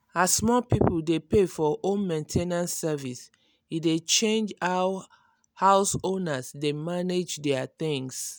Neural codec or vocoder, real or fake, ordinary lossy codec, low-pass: none; real; none; none